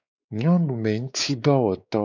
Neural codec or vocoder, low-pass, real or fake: codec, 16 kHz, 4 kbps, X-Codec, WavLM features, trained on Multilingual LibriSpeech; 7.2 kHz; fake